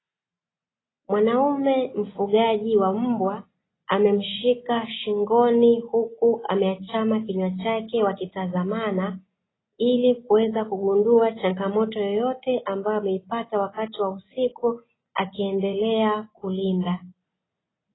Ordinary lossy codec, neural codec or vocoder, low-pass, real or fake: AAC, 16 kbps; none; 7.2 kHz; real